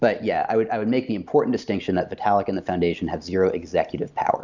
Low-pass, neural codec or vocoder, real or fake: 7.2 kHz; none; real